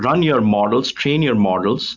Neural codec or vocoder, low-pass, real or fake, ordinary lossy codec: none; 7.2 kHz; real; Opus, 64 kbps